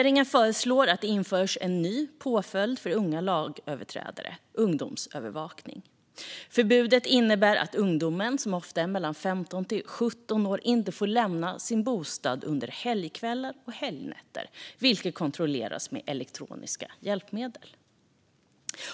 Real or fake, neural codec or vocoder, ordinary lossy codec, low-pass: real; none; none; none